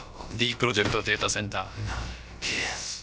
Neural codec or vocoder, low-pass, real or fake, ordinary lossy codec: codec, 16 kHz, about 1 kbps, DyCAST, with the encoder's durations; none; fake; none